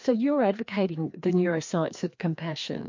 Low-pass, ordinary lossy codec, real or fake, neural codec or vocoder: 7.2 kHz; MP3, 48 kbps; fake; codec, 16 kHz, 2 kbps, FreqCodec, larger model